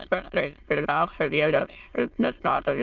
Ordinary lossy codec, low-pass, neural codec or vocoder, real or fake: Opus, 32 kbps; 7.2 kHz; autoencoder, 22.05 kHz, a latent of 192 numbers a frame, VITS, trained on many speakers; fake